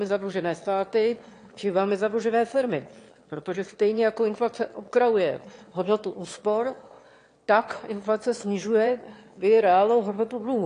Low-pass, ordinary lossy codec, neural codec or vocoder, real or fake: 9.9 kHz; AAC, 48 kbps; autoencoder, 22.05 kHz, a latent of 192 numbers a frame, VITS, trained on one speaker; fake